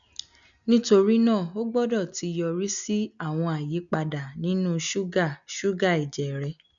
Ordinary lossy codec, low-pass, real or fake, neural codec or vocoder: none; 7.2 kHz; real; none